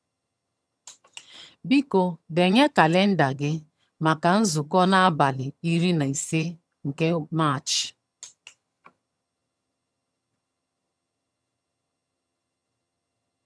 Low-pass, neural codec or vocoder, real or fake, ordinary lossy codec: none; vocoder, 22.05 kHz, 80 mel bands, HiFi-GAN; fake; none